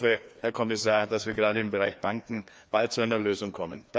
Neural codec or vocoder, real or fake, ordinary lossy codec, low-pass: codec, 16 kHz, 2 kbps, FreqCodec, larger model; fake; none; none